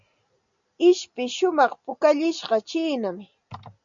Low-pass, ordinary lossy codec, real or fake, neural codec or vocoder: 7.2 kHz; MP3, 96 kbps; real; none